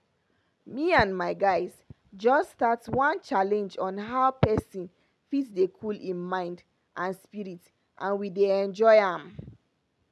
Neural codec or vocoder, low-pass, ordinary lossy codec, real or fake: none; none; none; real